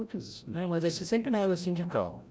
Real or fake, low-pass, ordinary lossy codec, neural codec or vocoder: fake; none; none; codec, 16 kHz, 0.5 kbps, FreqCodec, larger model